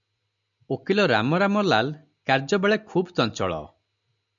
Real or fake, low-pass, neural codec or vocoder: real; 7.2 kHz; none